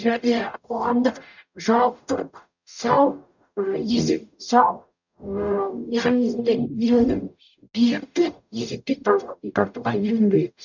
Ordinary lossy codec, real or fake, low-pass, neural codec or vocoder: none; fake; 7.2 kHz; codec, 44.1 kHz, 0.9 kbps, DAC